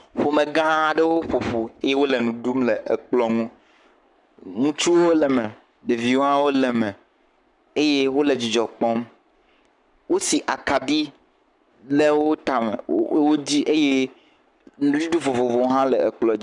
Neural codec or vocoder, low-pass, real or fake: codec, 44.1 kHz, 7.8 kbps, Pupu-Codec; 10.8 kHz; fake